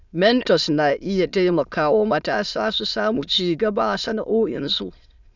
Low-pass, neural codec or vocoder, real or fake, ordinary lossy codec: 7.2 kHz; autoencoder, 22.05 kHz, a latent of 192 numbers a frame, VITS, trained on many speakers; fake; none